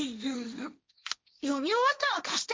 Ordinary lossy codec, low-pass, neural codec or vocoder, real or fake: none; none; codec, 16 kHz, 1.1 kbps, Voila-Tokenizer; fake